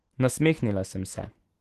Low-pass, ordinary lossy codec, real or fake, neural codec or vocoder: 14.4 kHz; Opus, 16 kbps; fake; autoencoder, 48 kHz, 128 numbers a frame, DAC-VAE, trained on Japanese speech